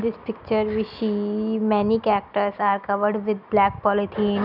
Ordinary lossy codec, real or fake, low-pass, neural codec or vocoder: none; real; 5.4 kHz; none